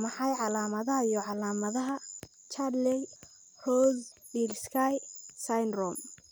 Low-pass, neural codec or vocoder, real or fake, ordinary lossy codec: none; none; real; none